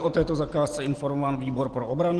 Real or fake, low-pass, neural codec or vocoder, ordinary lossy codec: real; 10.8 kHz; none; Opus, 16 kbps